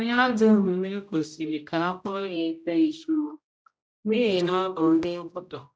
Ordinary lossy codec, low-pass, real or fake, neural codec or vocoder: none; none; fake; codec, 16 kHz, 0.5 kbps, X-Codec, HuBERT features, trained on general audio